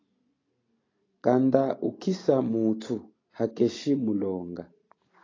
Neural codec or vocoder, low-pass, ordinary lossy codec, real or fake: none; 7.2 kHz; AAC, 32 kbps; real